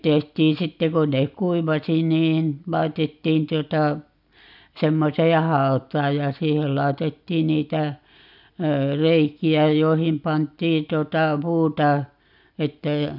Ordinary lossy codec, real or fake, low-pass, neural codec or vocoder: none; real; 5.4 kHz; none